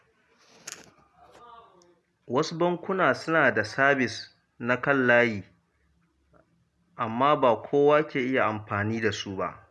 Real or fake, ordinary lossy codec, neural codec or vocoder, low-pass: real; none; none; none